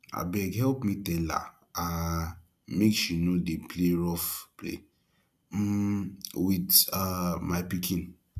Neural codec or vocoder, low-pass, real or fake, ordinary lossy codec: none; 14.4 kHz; real; none